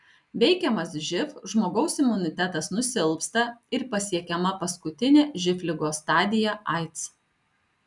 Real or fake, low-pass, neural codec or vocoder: real; 10.8 kHz; none